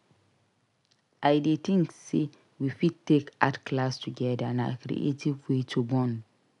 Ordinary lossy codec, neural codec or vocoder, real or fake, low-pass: none; none; real; 10.8 kHz